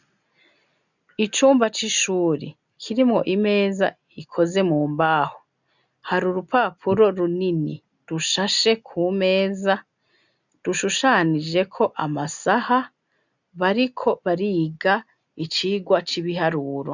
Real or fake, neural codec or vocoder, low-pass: real; none; 7.2 kHz